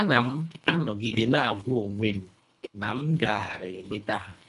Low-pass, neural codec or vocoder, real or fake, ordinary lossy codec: 10.8 kHz; codec, 24 kHz, 1.5 kbps, HILCodec; fake; none